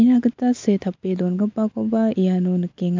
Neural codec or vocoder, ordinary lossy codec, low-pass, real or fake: none; MP3, 64 kbps; 7.2 kHz; real